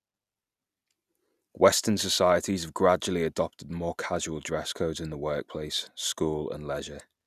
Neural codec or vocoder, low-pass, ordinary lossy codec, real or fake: none; 14.4 kHz; none; real